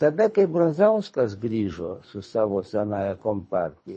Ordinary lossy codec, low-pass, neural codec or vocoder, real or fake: MP3, 32 kbps; 10.8 kHz; codec, 24 kHz, 3 kbps, HILCodec; fake